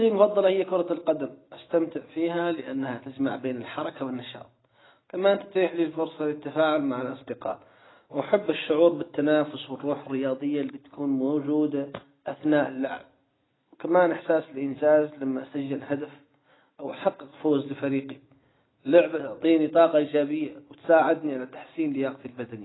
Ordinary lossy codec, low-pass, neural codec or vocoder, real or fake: AAC, 16 kbps; 7.2 kHz; none; real